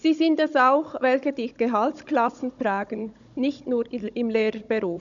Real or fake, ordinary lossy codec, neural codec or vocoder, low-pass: fake; none; codec, 16 kHz, 16 kbps, FunCodec, trained on Chinese and English, 50 frames a second; 7.2 kHz